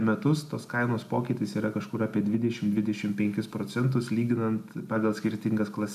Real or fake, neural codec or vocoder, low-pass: real; none; 14.4 kHz